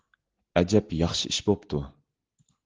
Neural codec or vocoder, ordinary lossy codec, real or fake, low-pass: none; Opus, 16 kbps; real; 7.2 kHz